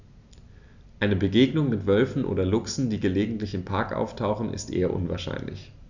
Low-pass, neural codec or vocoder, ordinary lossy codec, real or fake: 7.2 kHz; none; none; real